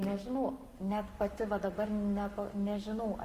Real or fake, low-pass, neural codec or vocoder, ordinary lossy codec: fake; 14.4 kHz; codec, 44.1 kHz, 7.8 kbps, Pupu-Codec; Opus, 32 kbps